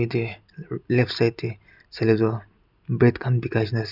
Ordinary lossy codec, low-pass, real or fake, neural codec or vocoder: none; 5.4 kHz; real; none